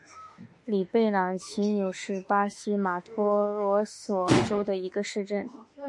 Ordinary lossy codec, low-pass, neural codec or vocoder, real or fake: MP3, 48 kbps; 10.8 kHz; autoencoder, 48 kHz, 32 numbers a frame, DAC-VAE, trained on Japanese speech; fake